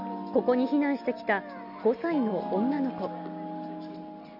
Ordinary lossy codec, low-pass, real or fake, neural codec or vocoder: none; 5.4 kHz; real; none